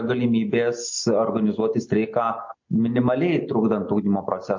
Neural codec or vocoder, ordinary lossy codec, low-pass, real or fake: none; MP3, 48 kbps; 7.2 kHz; real